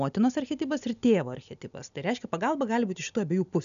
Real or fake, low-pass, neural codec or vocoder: real; 7.2 kHz; none